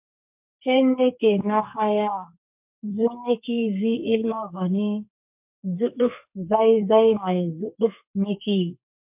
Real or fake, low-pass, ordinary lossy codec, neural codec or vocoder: fake; 3.6 kHz; MP3, 32 kbps; codec, 32 kHz, 1.9 kbps, SNAC